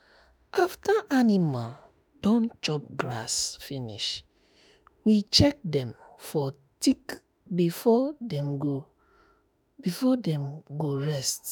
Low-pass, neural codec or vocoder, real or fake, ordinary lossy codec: none; autoencoder, 48 kHz, 32 numbers a frame, DAC-VAE, trained on Japanese speech; fake; none